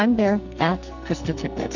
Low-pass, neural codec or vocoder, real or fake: 7.2 kHz; codec, 44.1 kHz, 2.6 kbps, SNAC; fake